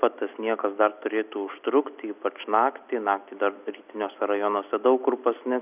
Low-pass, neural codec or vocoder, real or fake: 3.6 kHz; none; real